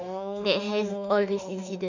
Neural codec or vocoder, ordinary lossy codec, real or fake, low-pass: autoencoder, 48 kHz, 32 numbers a frame, DAC-VAE, trained on Japanese speech; none; fake; 7.2 kHz